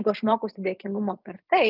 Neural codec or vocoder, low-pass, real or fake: vocoder, 44.1 kHz, 128 mel bands every 256 samples, BigVGAN v2; 5.4 kHz; fake